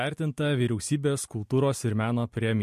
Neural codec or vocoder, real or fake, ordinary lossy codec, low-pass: none; real; MP3, 64 kbps; 14.4 kHz